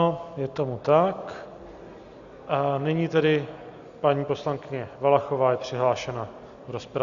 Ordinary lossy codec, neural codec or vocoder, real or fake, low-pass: Opus, 64 kbps; none; real; 7.2 kHz